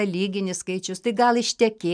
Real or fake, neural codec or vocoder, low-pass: real; none; 9.9 kHz